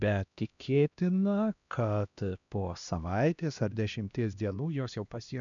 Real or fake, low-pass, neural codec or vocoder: fake; 7.2 kHz; codec, 16 kHz, 1 kbps, X-Codec, HuBERT features, trained on LibriSpeech